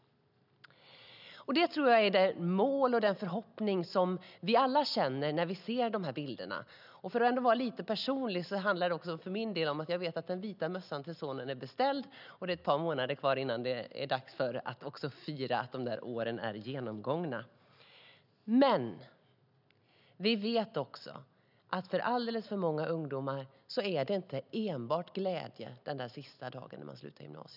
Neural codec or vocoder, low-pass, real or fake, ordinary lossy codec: none; 5.4 kHz; real; none